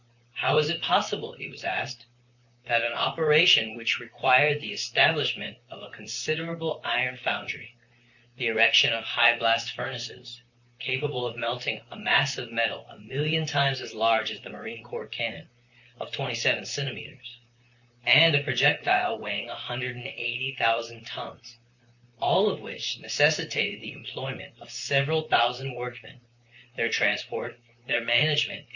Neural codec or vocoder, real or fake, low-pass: vocoder, 44.1 kHz, 128 mel bands, Pupu-Vocoder; fake; 7.2 kHz